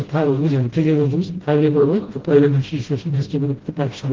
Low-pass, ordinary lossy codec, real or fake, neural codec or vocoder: 7.2 kHz; Opus, 16 kbps; fake; codec, 16 kHz, 0.5 kbps, FreqCodec, smaller model